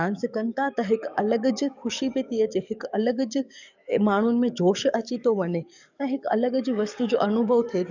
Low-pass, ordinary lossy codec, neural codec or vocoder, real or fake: 7.2 kHz; none; codec, 44.1 kHz, 7.8 kbps, DAC; fake